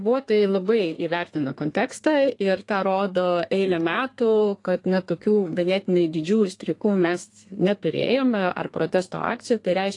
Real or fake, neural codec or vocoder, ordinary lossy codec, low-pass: fake; codec, 32 kHz, 1.9 kbps, SNAC; AAC, 48 kbps; 10.8 kHz